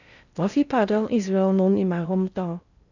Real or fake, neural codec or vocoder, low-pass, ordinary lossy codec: fake; codec, 16 kHz in and 24 kHz out, 0.6 kbps, FocalCodec, streaming, 4096 codes; 7.2 kHz; none